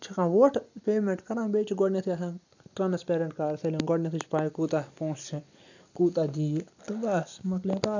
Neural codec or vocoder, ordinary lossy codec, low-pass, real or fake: none; none; 7.2 kHz; real